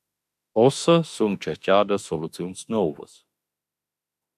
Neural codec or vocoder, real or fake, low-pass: autoencoder, 48 kHz, 32 numbers a frame, DAC-VAE, trained on Japanese speech; fake; 14.4 kHz